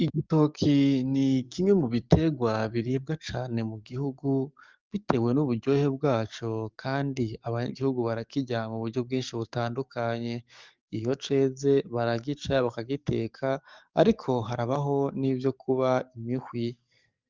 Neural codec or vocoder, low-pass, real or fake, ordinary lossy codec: codec, 44.1 kHz, 7.8 kbps, DAC; 7.2 kHz; fake; Opus, 24 kbps